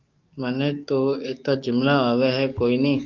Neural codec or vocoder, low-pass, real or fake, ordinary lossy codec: codec, 44.1 kHz, 7.8 kbps, DAC; 7.2 kHz; fake; Opus, 24 kbps